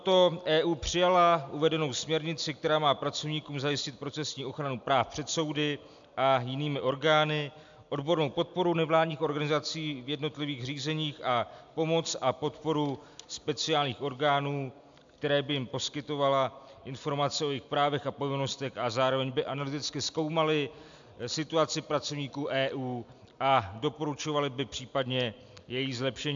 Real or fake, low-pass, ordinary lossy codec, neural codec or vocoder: real; 7.2 kHz; AAC, 64 kbps; none